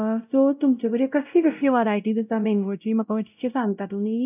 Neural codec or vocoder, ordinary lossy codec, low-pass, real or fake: codec, 16 kHz, 0.5 kbps, X-Codec, WavLM features, trained on Multilingual LibriSpeech; none; 3.6 kHz; fake